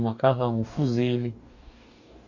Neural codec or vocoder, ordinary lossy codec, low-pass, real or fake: codec, 44.1 kHz, 2.6 kbps, DAC; none; 7.2 kHz; fake